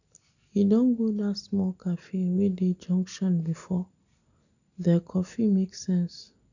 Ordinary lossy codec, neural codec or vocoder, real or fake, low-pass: none; none; real; 7.2 kHz